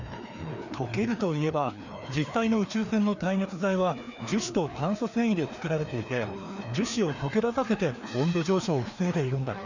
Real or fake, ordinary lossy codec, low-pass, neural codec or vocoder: fake; none; 7.2 kHz; codec, 16 kHz, 2 kbps, FreqCodec, larger model